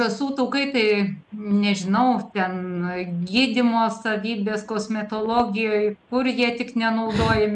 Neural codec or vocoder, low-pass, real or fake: none; 10.8 kHz; real